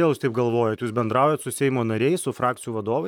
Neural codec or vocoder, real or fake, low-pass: vocoder, 44.1 kHz, 128 mel bands, Pupu-Vocoder; fake; 19.8 kHz